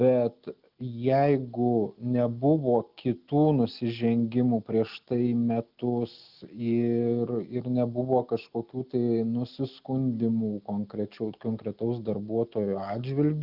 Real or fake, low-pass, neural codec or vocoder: real; 5.4 kHz; none